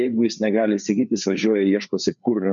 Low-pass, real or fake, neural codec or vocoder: 7.2 kHz; fake; codec, 16 kHz, 4 kbps, FreqCodec, larger model